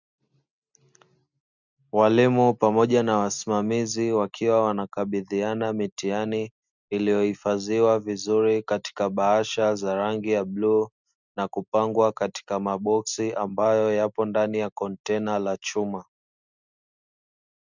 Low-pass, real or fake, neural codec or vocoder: 7.2 kHz; real; none